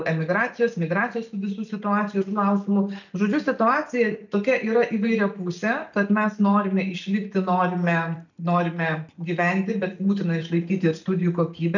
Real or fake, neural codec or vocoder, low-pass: real; none; 7.2 kHz